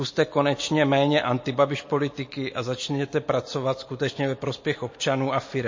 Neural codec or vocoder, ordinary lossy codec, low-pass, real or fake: none; MP3, 32 kbps; 7.2 kHz; real